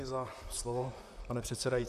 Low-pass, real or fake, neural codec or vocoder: 14.4 kHz; fake; vocoder, 44.1 kHz, 128 mel bands, Pupu-Vocoder